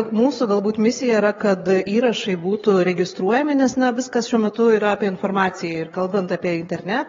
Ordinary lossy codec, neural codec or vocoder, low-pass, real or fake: AAC, 24 kbps; codec, 16 kHz, 4 kbps, FreqCodec, larger model; 7.2 kHz; fake